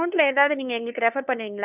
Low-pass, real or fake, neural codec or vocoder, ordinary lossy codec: 3.6 kHz; fake; codec, 16 kHz, 2 kbps, FunCodec, trained on LibriTTS, 25 frames a second; none